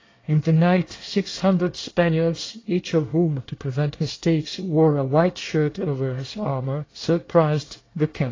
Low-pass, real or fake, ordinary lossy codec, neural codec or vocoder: 7.2 kHz; fake; AAC, 32 kbps; codec, 24 kHz, 1 kbps, SNAC